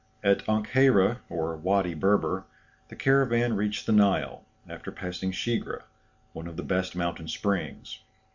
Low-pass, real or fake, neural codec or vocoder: 7.2 kHz; real; none